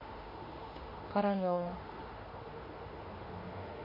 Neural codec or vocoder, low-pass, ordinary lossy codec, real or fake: autoencoder, 48 kHz, 32 numbers a frame, DAC-VAE, trained on Japanese speech; 5.4 kHz; MP3, 24 kbps; fake